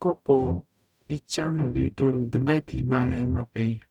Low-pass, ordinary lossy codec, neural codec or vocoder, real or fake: 19.8 kHz; none; codec, 44.1 kHz, 0.9 kbps, DAC; fake